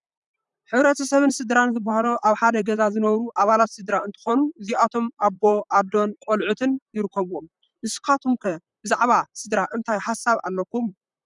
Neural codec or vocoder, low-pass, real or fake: vocoder, 44.1 kHz, 128 mel bands, Pupu-Vocoder; 10.8 kHz; fake